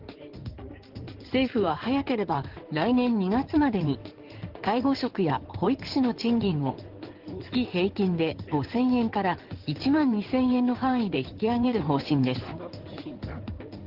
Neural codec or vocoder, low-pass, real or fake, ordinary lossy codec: codec, 16 kHz in and 24 kHz out, 2.2 kbps, FireRedTTS-2 codec; 5.4 kHz; fake; Opus, 16 kbps